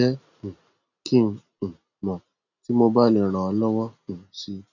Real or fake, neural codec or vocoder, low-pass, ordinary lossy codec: real; none; 7.2 kHz; none